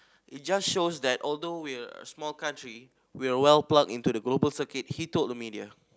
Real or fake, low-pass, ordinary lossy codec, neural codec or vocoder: real; none; none; none